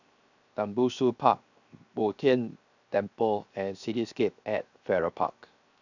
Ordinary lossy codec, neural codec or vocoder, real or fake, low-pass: none; codec, 16 kHz, 0.7 kbps, FocalCodec; fake; 7.2 kHz